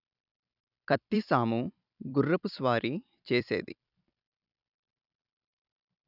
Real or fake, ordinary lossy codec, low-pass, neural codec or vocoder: fake; none; 5.4 kHz; vocoder, 44.1 kHz, 128 mel bands every 256 samples, BigVGAN v2